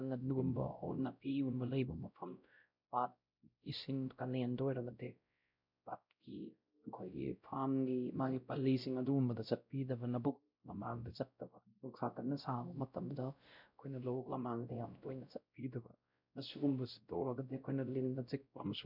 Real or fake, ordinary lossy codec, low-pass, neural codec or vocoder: fake; none; 5.4 kHz; codec, 16 kHz, 0.5 kbps, X-Codec, WavLM features, trained on Multilingual LibriSpeech